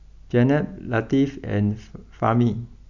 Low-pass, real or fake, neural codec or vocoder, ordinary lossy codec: 7.2 kHz; real; none; none